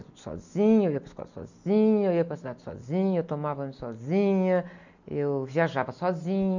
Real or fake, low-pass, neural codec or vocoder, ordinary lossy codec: real; 7.2 kHz; none; none